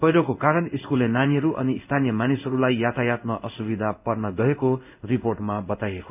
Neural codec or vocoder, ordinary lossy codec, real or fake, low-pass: codec, 16 kHz in and 24 kHz out, 1 kbps, XY-Tokenizer; none; fake; 3.6 kHz